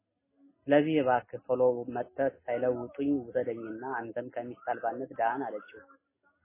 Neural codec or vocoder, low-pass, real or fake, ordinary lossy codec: none; 3.6 kHz; real; MP3, 16 kbps